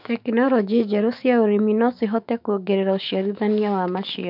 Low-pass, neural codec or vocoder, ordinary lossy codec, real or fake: 5.4 kHz; autoencoder, 48 kHz, 128 numbers a frame, DAC-VAE, trained on Japanese speech; none; fake